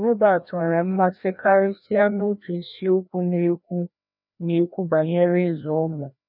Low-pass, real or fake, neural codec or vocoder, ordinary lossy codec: 5.4 kHz; fake; codec, 16 kHz, 1 kbps, FreqCodec, larger model; none